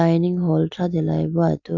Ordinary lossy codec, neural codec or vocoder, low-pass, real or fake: none; none; 7.2 kHz; real